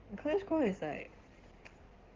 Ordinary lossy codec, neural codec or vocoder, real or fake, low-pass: Opus, 16 kbps; codec, 16 kHz in and 24 kHz out, 2.2 kbps, FireRedTTS-2 codec; fake; 7.2 kHz